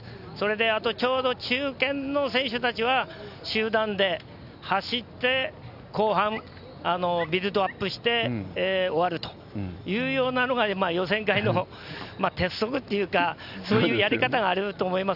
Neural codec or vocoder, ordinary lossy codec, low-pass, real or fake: none; none; 5.4 kHz; real